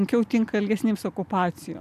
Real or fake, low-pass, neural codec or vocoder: real; 14.4 kHz; none